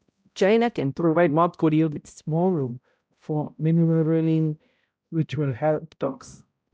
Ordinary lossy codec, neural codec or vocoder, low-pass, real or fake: none; codec, 16 kHz, 0.5 kbps, X-Codec, HuBERT features, trained on balanced general audio; none; fake